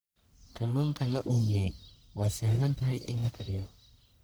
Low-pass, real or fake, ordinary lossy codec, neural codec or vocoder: none; fake; none; codec, 44.1 kHz, 1.7 kbps, Pupu-Codec